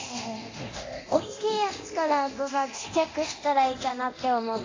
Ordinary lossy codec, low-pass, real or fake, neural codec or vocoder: AAC, 32 kbps; 7.2 kHz; fake; codec, 24 kHz, 0.9 kbps, DualCodec